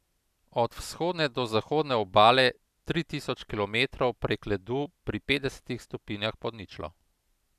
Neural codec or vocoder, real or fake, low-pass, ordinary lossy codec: none; real; 14.4 kHz; none